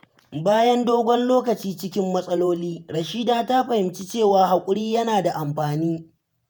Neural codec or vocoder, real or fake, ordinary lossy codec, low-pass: vocoder, 48 kHz, 128 mel bands, Vocos; fake; none; none